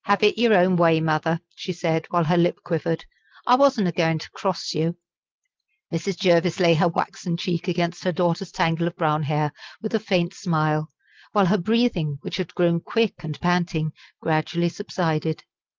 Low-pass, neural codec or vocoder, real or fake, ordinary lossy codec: 7.2 kHz; none; real; Opus, 32 kbps